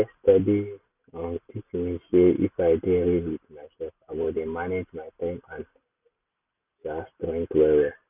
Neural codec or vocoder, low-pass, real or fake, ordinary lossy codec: none; 3.6 kHz; real; none